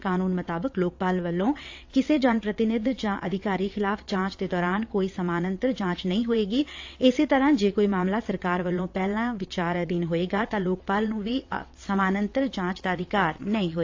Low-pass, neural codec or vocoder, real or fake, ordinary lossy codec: 7.2 kHz; vocoder, 22.05 kHz, 80 mel bands, WaveNeXt; fake; AAC, 48 kbps